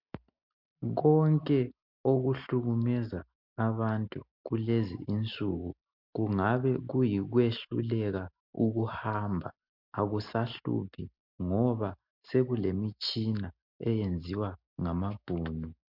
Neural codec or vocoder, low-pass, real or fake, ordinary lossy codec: none; 5.4 kHz; real; MP3, 48 kbps